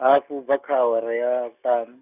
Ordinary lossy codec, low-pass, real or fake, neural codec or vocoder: none; 3.6 kHz; real; none